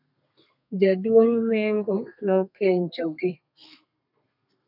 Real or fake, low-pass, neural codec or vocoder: fake; 5.4 kHz; codec, 32 kHz, 1.9 kbps, SNAC